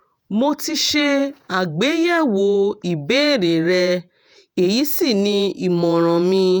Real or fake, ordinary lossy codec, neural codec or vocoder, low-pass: fake; none; vocoder, 48 kHz, 128 mel bands, Vocos; none